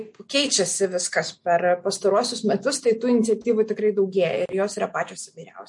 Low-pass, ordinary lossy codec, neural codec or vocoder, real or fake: 10.8 kHz; MP3, 48 kbps; none; real